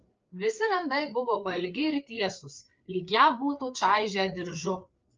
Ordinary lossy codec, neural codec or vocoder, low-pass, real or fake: Opus, 24 kbps; codec, 16 kHz, 4 kbps, FreqCodec, larger model; 7.2 kHz; fake